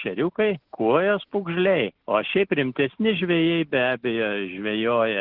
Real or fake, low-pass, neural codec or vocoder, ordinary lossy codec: real; 5.4 kHz; none; Opus, 16 kbps